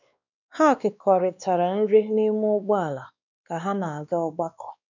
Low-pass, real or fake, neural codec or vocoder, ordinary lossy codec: 7.2 kHz; fake; codec, 16 kHz, 2 kbps, X-Codec, WavLM features, trained on Multilingual LibriSpeech; none